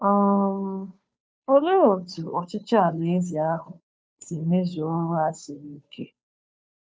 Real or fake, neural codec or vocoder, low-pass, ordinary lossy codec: fake; codec, 16 kHz, 2 kbps, FunCodec, trained on Chinese and English, 25 frames a second; none; none